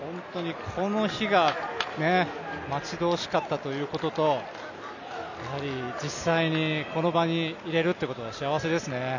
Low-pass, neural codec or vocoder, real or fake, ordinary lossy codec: 7.2 kHz; none; real; none